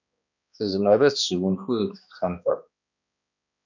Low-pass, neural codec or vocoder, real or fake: 7.2 kHz; codec, 16 kHz, 1 kbps, X-Codec, HuBERT features, trained on balanced general audio; fake